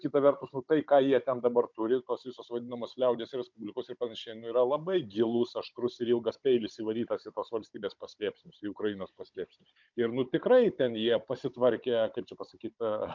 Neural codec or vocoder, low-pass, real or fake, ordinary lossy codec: codec, 16 kHz, 16 kbps, FunCodec, trained on Chinese and English, 50 frames a second; 7.2 kHz; fake; MP3, 64 kbps